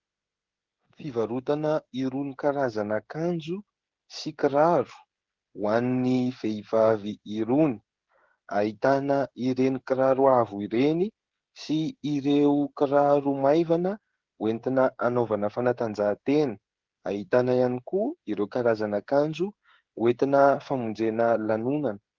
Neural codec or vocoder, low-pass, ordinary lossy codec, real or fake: codec, 16 kHz, 16 kbps, FreqCodec, smaller model; 7.2 kHz; Opus, 16 kbps; fake